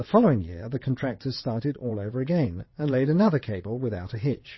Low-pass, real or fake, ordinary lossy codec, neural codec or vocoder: 7.2 kHz; fake; MP3, 24 kbps; vocoder, 22.05 kHz, 80 mel bands, WaveNeXt